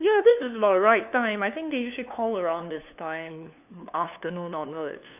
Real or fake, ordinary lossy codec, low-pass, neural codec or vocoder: fake; none; 3.6 kHz; codec, 16 kHz, 2 kbps, FunCodec, trained on LibriTTS, 25 frames a second